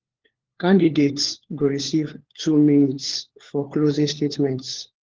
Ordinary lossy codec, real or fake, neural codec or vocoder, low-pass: Opus, 16 kbps; fake; codec, 16 kHz, 4 kbps, FunCodec, trained on LibriTTS, 50 frames a second; 7.2 kHz